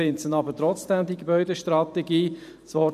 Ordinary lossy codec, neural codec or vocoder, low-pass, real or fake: none; vocoder, 44.1 kHz, 128 mel bands every 512 samples, BigVGAN v2; 14.4 kHz; fake